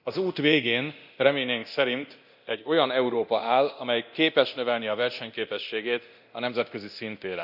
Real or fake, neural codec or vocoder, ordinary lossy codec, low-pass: fake; codec, 24 kHz, 0.9 kbps, DualCodec; none; 5.4 kHz